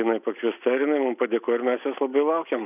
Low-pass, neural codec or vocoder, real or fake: 3.6 kHz; none; real